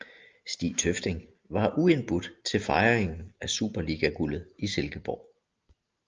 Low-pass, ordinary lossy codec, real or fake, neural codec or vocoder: 7.2 kHz; Opus, 24 kbps; real; none